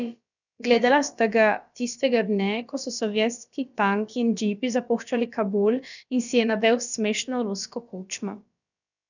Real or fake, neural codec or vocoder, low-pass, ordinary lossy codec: fake; codec, 16 kHz, about 1 kbps, DyCAST, with the encoder's durations; 7.2 kHz; none